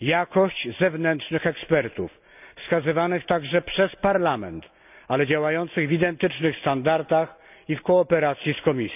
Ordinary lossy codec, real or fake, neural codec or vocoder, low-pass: none; real; none; 3.6 kHz